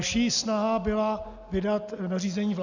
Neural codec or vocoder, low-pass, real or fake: none; 7.2 kHz; real